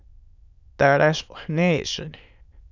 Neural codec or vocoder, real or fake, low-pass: autoencoder, 22.05 kHz, a latent of 192 numbers a frame, VITS, trained on many speakers; fake; 7.2 kHz